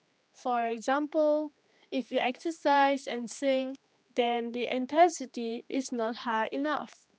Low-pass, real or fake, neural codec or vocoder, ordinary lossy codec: none; fake; codec, 16 kHz, 2 kbps, X-Codec, HuBERT features, trained on general audio; none